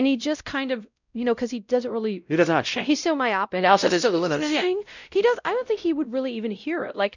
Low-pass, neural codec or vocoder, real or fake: 7.2 kHz; codec, 16 kHz, 0.5 kbps, X-Codec, WavLM features, trained on Multilingual LibriSpeech; fake